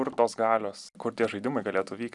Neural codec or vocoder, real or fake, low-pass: none; real; 10.8 kHz